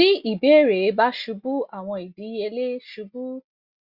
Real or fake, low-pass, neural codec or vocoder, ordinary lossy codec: real; 5.4 kHz; none; Opus, 64 kbps